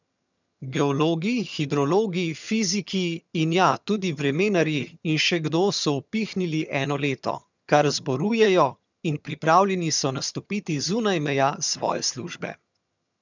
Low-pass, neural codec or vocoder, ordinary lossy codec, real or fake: 7.2 kHz; vocoder, 22.05 kHz, 80 mel bands, HiFi-GAN; none; fake